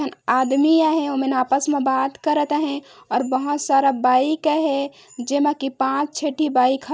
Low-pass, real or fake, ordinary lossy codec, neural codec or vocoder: none; real; none; none